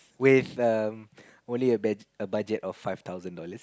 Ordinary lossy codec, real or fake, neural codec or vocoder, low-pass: none; real; none; none